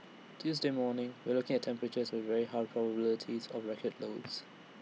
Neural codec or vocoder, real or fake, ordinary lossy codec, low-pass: none; real; none; none